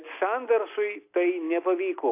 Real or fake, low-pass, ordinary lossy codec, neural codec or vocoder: real; 3.6 kHz; AAC, 24 kbps; none